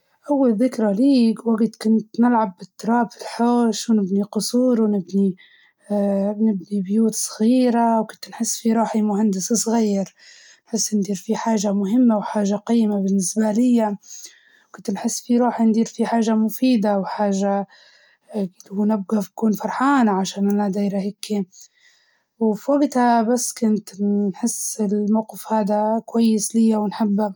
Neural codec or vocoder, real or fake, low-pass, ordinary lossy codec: none; real; none; none